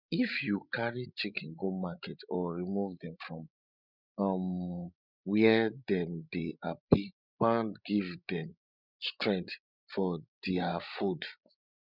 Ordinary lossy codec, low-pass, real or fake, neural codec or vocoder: none; 5.4 kHz; fake; codec, 16 kHz, 16 kbps, FreqCodec, larger model